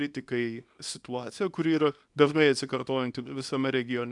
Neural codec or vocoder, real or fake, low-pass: codec, 24 kHz, 0.9 kbps, WavTokenizer, small release; fake; 10.8 kHz